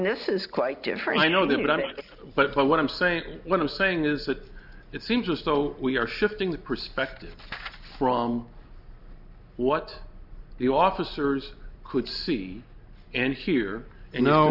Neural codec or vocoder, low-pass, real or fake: none; 5.4 kHz; real